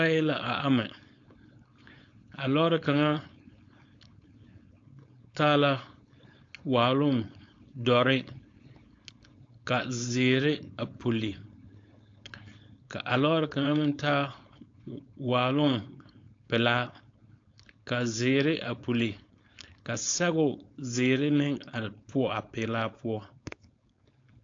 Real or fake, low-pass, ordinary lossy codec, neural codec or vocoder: fake; 7.2 kHz; AAC, 48 kbps; codec, 16 kHz, 4.8 kbps, FACodec